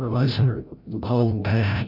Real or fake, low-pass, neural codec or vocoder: fake; 5.4 kHz; codec, 16 kHz, 0.5 kbps, FreqCodec, larger model